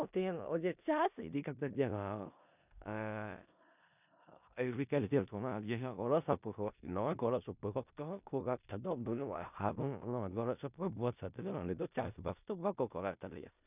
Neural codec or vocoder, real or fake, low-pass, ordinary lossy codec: codec, 16 kHz in and 24 kHz out, 0.4 kbps, LongCat-Audio-Codec, four codebook decoder; fake; 3.6 kHz; none